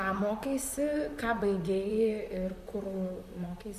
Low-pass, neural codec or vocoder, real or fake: 14.4 kHz; vocoder, 44.1 kHz, 128 mel bands, Pupu-Vocoder; fake